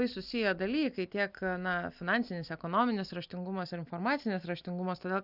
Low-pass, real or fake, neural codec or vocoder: 5.4 kHz; real; none